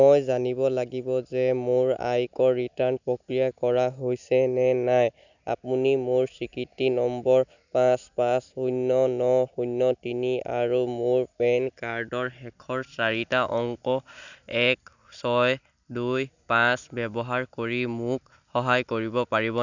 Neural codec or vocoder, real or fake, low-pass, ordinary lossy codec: none; real; 7.2 kHz; none